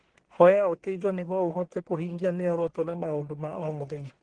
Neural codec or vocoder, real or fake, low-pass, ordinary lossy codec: codec, 44.1 kHz, 1.7 kbps, Pupu-Codec; fake; 9.9 kHz; Opus, 16 kbps